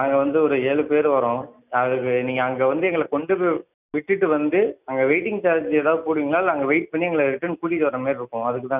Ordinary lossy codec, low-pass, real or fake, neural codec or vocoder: none; 3.6 kHz; real; none